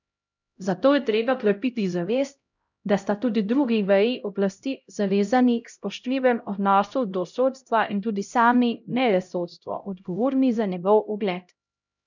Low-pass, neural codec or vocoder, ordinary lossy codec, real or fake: 7.2 kHz; codec, 16 kHz, 0.5 kbps, X-Codec, HuBERT features, trained on LibriSpeech; none; fake